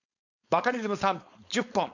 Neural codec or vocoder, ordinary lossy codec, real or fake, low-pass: codec, 16 kHz, 4.8 kbps, FACodec; none; fake; 7.2 kHz